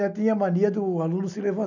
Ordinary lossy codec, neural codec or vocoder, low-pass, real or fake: none; none; 7.2 kHz; real